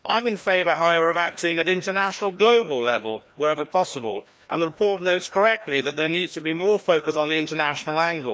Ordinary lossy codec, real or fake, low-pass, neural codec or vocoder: none; fake; none; codec, 16 kHz, 1 kbps, FreqCodec, larger model